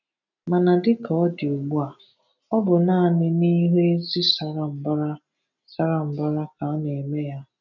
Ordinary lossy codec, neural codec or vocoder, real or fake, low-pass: none; none; real; 7.2 kHz